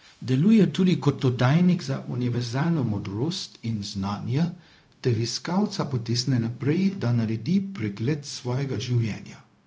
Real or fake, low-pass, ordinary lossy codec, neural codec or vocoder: fake; none; none; codec, 16 kHz, 0.4 kbps, LongCat-Audio-Codec